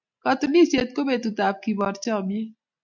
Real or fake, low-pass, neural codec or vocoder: real; 7.2 kHz; none